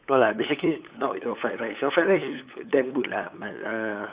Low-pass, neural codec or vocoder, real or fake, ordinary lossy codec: 3.6 kHz; codec, 16 kHz, 8 kbps, FunCodec, trained on LibriTTS, 25 frames a second; fake; Opus, 64 kbps